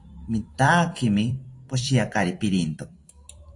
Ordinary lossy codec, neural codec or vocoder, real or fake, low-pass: AAC, 48 kbps; vocoder, 44.1 kHz, 128 mel bands every 256 samples, BigVGAN v2; fake; 10.8 kHz